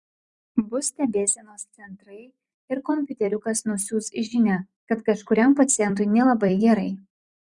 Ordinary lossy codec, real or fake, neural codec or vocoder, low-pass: Opus, 64 kbps; fake; vocoder, 44.1 kHz, 128 mel bands every 256 samples, BigVGAN v2; 10.8 kHz